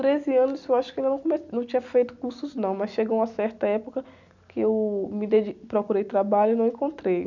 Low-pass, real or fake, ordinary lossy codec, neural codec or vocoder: 7.2 kHz; real; none; none